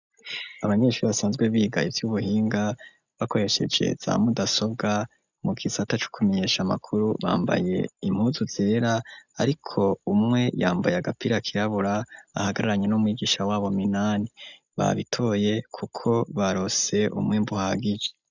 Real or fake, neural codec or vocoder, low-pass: real; none; 7.2 kHz